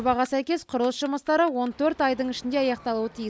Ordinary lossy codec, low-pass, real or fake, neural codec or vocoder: none; none; real; none